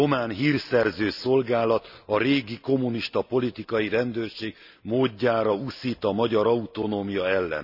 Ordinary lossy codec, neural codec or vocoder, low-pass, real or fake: none; none; 5.4 kHz; real